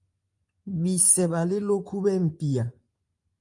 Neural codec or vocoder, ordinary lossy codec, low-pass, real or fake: vocoder, 24 kHz, 100 mel bands, Vocos; Opus, 32 kbps; 10.8 kHz; fake